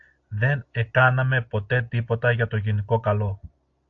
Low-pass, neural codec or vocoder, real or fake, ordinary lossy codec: 7.2 kHz; none; real; AAC, 48 kbps